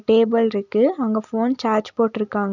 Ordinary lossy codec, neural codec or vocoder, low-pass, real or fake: none; none; 7.2 kHz; real